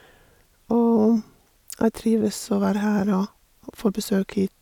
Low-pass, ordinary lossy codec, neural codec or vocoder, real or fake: 19.8 kHz; none; none; real